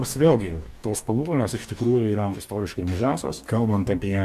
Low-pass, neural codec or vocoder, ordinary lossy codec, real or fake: 14.4 kHz; codec, 44.1 kHz, 2.6 kbps, DAC; AAC, 96 kbps; fake